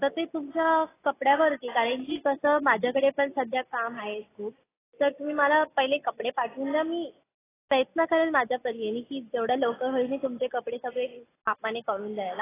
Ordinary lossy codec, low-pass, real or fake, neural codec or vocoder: AAC, 16 kbps; 3.6 kHz; real; none